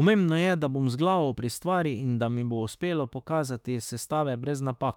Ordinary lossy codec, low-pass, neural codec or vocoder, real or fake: none; 19.8 kHz; autoencoder, 48 kHz, 32 numbers a frame, DAC-VAE, trained on Japanese speech; fake